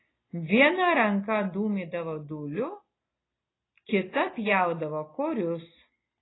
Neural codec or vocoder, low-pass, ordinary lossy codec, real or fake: none; 7.2 kHz; AAC, 16 kbps; real